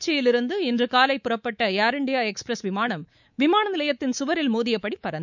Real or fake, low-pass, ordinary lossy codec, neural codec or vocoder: fake; 7.2 kHz; none; codec, 24 kHz, 3.1 kbps, DualCodec